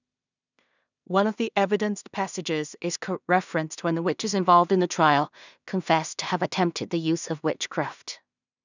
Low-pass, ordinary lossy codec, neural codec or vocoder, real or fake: 7.2 kHz; none; codec, 16 kHz in and 24 kHz out, 0.4 kbps, LongCat-Audio-Codec, two codebook decoder; fake